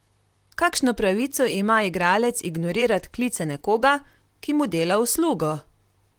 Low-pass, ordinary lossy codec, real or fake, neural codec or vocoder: 19.8 kHz; Opus, 24 kbps; fake; vocoder, 44.1 kHz, 128 mel bands, Pupu-Vocoder